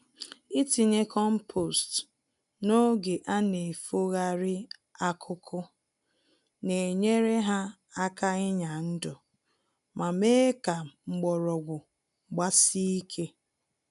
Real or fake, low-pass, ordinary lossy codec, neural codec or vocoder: real; 10.8 kHz; none; none